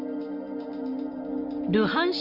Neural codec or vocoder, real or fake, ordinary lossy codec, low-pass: none; real; Opus, 24 kbps; 5.4 kHz